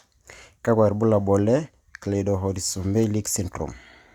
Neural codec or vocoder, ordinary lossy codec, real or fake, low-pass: none; Opus, 64 kbps; real; 19.8 kHz